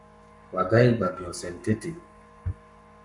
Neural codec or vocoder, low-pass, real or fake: codec, 44.1 kHz, 7.8 kbps, Pupu-Codec; 10.8 kHz; fake